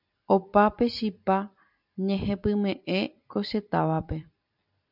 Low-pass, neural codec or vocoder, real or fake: 5.4 kHz; vocoder, 44.1 kHz, 128 mel bands every 256 samples, BigVGAN v2; fake